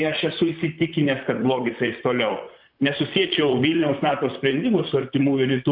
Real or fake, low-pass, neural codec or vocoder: fake; 5.4 kHz; vocoder, 44.1 kHz, 128 mel bands, Pupu-Vocoder